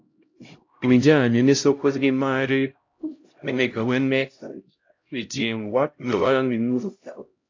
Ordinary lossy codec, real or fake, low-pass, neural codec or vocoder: AAC, 48 kbps; fake; 7.2 kHz; codec, 16 kHz, 0.5 kbps, X-Codec, HuBERT features, trained on LibriSpeech